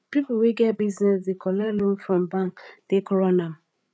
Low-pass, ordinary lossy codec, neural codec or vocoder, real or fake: none; none; codec, 16 kHz, 8 kbps, FreqCodec, larger model; fake